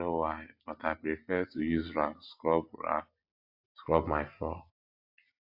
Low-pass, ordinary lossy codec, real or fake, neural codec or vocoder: 5.4 kHz; AAC, 48 kbps; real; none